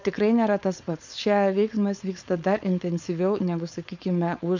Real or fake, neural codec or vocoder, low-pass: fake; codec, 16 kHz, 4.8 kbps, FACodec; 7.2 kHz